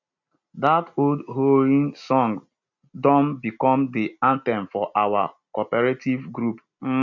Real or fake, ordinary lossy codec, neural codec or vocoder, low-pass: real; none; none; 7.2 kHz